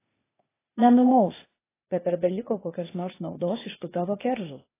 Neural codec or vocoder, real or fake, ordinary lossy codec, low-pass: codec, 16 kHz, 0.8 kbps, ZipCodec; fake; AAC, 16 kbps; 3.6 kHz